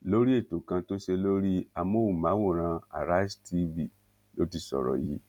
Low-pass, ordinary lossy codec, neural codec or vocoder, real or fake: 19.8 kHz; none; none; real